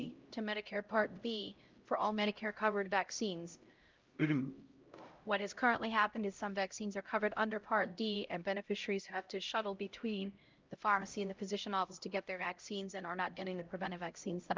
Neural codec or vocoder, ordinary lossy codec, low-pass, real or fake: codec, 16 kHz, 0.5 kbps, X-Codec, HuBERT features, trained on LibriSpeech; Opus, 24 kbps; 7.2 kHz; fake